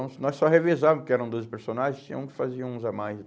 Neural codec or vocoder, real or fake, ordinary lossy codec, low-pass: none; real; none; none